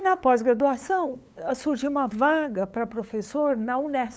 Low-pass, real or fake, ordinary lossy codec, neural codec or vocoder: none; fake; none; codec, 16 kHz, 8 kbps, FunCodec, trained on LibriTTS, 25 frames a second